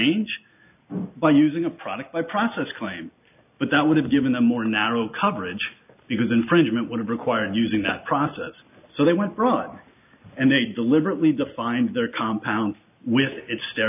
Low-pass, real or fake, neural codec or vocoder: 3.6 kHz; real; none